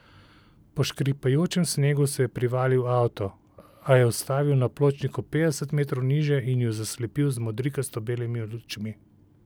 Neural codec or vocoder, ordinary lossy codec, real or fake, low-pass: none; none; real; none